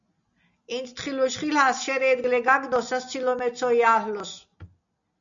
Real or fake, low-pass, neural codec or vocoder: real; 7.2 kHz; none